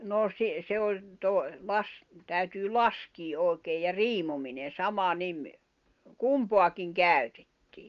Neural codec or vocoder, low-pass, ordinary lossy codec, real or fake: none; 7.2 kHz; Opus, 32 kbps; real